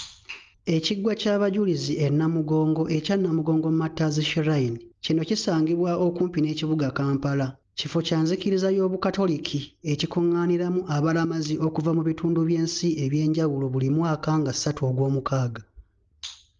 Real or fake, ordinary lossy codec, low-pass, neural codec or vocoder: real; Opus, 24 kbps; 7.2 kHz; none